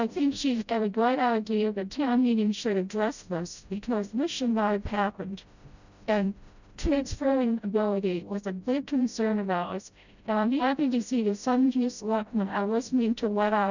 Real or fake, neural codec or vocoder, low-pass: fake; codec, 16 kHz, 0.5 kbps, FreqCodec, smaller model; 7.2 kHz